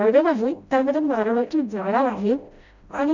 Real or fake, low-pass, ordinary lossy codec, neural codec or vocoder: fake; 7.2 kHz; none; codec, 16 kHz, 0.5 kbps, FreqCodec, smaller model